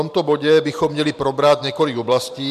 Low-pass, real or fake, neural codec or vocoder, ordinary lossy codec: 14.4 kHz; fake; vocoder, 44.1 kHz, 128 mel bands every 512 samples, BigVGAN v2; MP3, 96 kbps